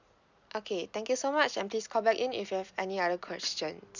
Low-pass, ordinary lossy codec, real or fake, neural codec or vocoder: 7.2 kHz; none; real; none